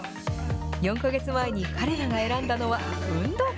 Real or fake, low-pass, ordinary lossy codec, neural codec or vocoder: real; none; none; none